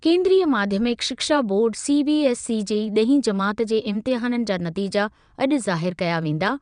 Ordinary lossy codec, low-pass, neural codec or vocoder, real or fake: none; 9.9 kHz; vocoder, 22.05 kHz, 80 mel bands, WaveNeXt; fake